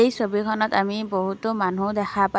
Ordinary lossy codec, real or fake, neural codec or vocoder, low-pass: none; real; none; none